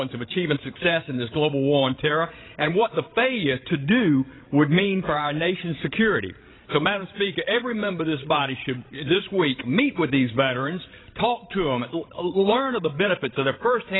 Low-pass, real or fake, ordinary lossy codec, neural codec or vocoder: 7.2 kHz; fake; AAC, 16 kbps; codec, 16 kHz, 16 kbps, FreqCodec, larger model